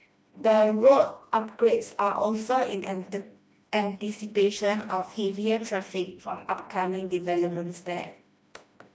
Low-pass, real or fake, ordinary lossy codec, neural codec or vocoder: none; fake; none; codec, 16 kHz, 1 kbps, FreqCodec, smaller model